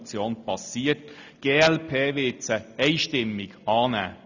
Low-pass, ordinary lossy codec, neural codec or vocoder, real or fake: 7.2 kHz; none; none; real